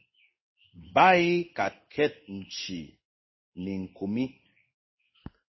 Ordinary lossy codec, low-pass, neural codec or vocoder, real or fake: MP3, 24 kbps; 7.2 kHz; codec, 16 kHz in and 24 kHz out, 1 kbps, XY-Tokenizer; fake